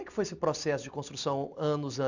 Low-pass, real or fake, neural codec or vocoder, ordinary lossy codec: 7.2 kHz; real; none; none